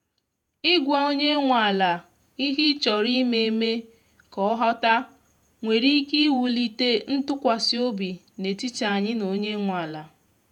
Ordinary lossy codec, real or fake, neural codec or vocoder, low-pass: none; fake; vocoder, 48 kHz, 128 mel bands, Vocos; 19.8 kHz